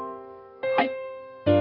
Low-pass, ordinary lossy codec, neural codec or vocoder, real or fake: 5.4 kHz; none; codec, 32 kHz, 1.9 kbps, SNAC; fake